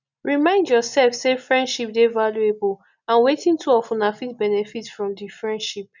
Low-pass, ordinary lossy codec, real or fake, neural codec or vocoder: 7.2 kHz; none; real; none